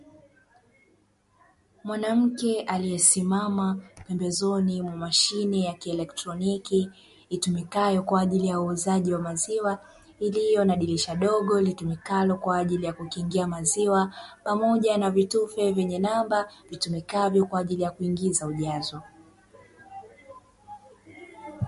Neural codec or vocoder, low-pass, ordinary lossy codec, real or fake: none; 14.4 kHz; MP3, 48 kbps; real